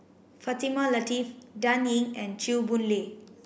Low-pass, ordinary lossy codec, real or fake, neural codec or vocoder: none; none; real; none